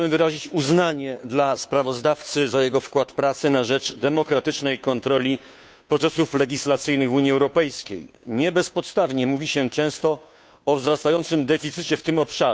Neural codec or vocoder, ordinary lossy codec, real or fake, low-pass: codec, 16 kHz, 2 kbps, FunCodec, trained on Chinese and English, 25 frames a second; none; fake; none